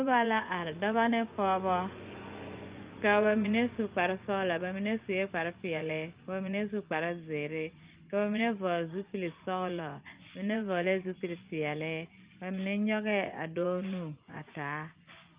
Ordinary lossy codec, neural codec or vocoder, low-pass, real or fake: Opus, 24 kbps; vocoder, 24 kHz, 100 mel bands, Vocos; 3.6 kHz; fake